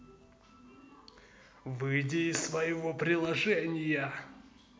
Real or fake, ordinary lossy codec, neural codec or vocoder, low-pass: real; none; none; none